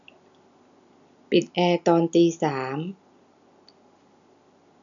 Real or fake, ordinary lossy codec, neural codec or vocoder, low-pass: real; none; none; 7.2 kHz